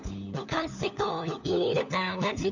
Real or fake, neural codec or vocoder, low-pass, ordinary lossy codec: fake; codec, 16 kHz, 4 kbps, FunCodec, trained on LibriTTS, 50 frames a second; 7.2 kHz; none